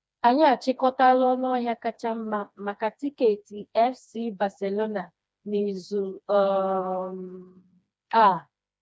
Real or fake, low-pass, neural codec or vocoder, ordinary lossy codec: fake; none; codec, 16 kHz, 2 kbps, FreqCodec, smaller model; none